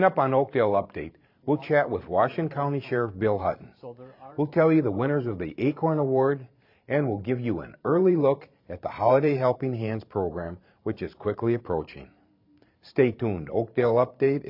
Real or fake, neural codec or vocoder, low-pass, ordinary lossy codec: fake; vocoder, 44.1 kHz, 128 mel bands every 512 samples, BigVGAN v2; 5.4 kHz; MP3, 48 kbps